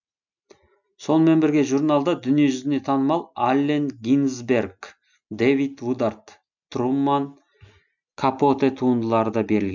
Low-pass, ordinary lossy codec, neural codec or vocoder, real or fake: 7.2 kHz; none; none; real